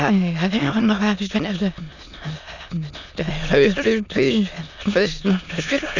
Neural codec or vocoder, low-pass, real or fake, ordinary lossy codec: autoencoder, 22.05 kHz, a latent of 192 numbers a frame, VITS, trained on many speakers; 7.2 kHz; fake; none